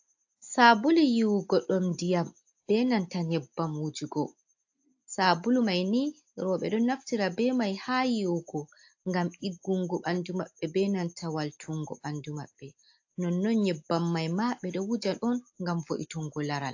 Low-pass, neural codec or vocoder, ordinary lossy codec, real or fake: 7.2 kHz; none; AAC, 48 kbps; real